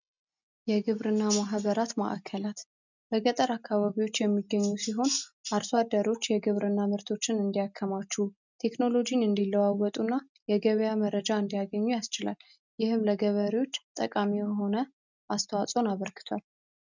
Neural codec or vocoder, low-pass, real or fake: none; 7.2 kHz; real